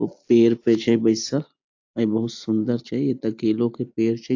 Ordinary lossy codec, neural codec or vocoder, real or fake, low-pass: AAC, 48 kbps; none; real; 7.2 kHz